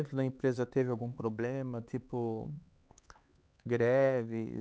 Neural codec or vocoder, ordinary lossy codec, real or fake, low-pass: codec, 16 kHz, 4 kbps, X-Codec, HuBERT features, trained on LibriSpeech; none; fake; none